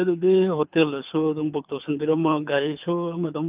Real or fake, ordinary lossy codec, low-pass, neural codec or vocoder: fake; Opus, 24 kbps; 3.6 kHz; codec, 24 kHz, 6 kbps, HILCodec